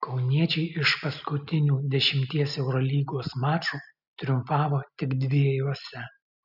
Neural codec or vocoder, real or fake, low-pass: none; real; 5.4 kHz